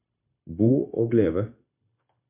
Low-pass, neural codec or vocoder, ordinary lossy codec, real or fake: 3.6 kHz; codec, 16 kHz, 0.9 kbps, LongCat-Audio-Codec; MP3, 32 kbps; fake